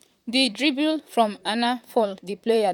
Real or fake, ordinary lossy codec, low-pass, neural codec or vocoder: fake; none; 19.8 kHz; vocoder, 44.1 kHz, 128 mel bands, Pupu-Vocoder